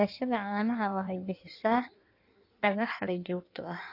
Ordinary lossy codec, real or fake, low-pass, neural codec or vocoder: AAC, 32 kbps; fake; 5.4 kHz; codec, 16 kHz in and 24 kHz out, 1.1 kbps, FireRedTTS-2 codec